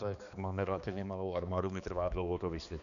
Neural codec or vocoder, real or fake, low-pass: codec, 16 kHz, 2 kbps, X-Codec, HuBERT features, trained on balanced general audio; fake; 7.2 kHz